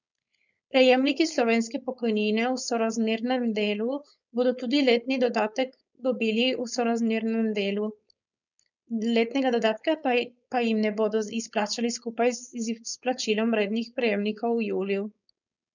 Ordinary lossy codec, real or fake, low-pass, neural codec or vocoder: none; fake; 7.2 kHz; codec, 16 kHz, 4.8 kbps, FACodec